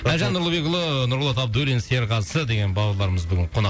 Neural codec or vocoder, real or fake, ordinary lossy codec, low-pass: none; real; none; none